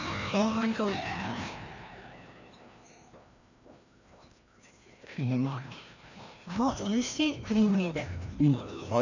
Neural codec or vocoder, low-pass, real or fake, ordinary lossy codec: codec, 16 kHz, 1 kbps, FreqCodec, larger model; 7.2 kHz; fake; none